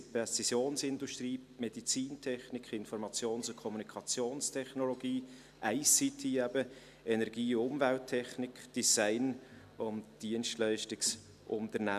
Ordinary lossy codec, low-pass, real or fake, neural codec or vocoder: none; 14.4 kHz; real; none